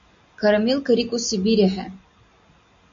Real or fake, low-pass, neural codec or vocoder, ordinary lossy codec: real; 7.2 kHz; none; MP3, 48 kbps